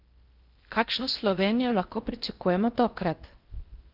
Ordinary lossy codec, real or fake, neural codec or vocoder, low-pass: Opus, 24 kbps; fake; codec, 16 kHz in and 24 kHz out, 0.6 kbps, FocalCodec, streaming, 4096 codes; 5.4 kHz